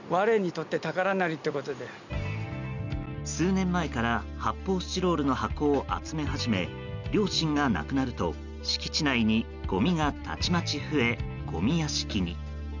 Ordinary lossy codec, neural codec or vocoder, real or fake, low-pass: none; none; real; 7.2 kHz